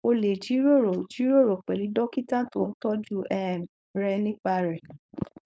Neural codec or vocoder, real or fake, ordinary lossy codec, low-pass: codec, 16 kHz, 4.8 kbps, FACodec; fake; none; none